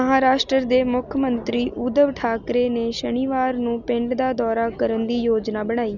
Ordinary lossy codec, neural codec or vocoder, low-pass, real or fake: none; none; 7.2 kHz; real